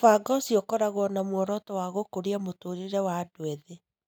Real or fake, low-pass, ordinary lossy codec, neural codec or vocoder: real; none; none; none